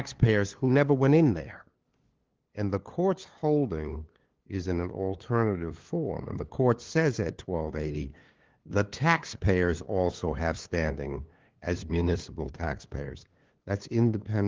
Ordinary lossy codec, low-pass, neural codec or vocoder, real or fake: Opus, 16 kbps; 7.2 kHz; codec, 16 kHz, 2 kbps, FunCodec, trained on LibriTTS, 25 frames a second; fake